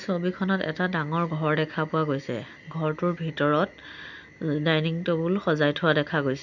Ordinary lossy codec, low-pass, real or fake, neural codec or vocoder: none; 7.2 kHz; real; none